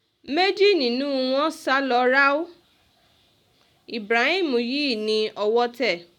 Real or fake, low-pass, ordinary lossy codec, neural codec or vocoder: real; 19.8 kHz; none; none